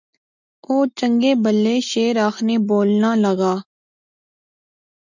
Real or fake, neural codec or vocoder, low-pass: real; none; 7.2 kHz